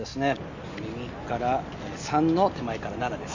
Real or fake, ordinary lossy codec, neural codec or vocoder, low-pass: real; none; none; 7.2 kHz